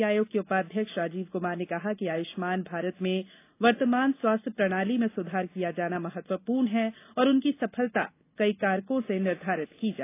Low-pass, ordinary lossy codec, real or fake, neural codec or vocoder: 3.6 kHz; AAC, 24 kbps; real; none